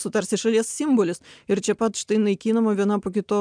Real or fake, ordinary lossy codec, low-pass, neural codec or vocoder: real; MP3, 96 kbps; 9.9 kHz; none